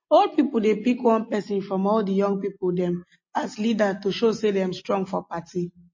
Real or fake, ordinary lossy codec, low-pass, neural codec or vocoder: real; MP3, 32 kbps; 7.2 kHz; none